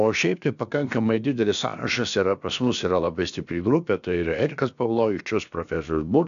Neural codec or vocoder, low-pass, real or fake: codec, 16 kHz, about 1 kbps, DyCAST, with the encoder's durations; 7.2 kHz; fake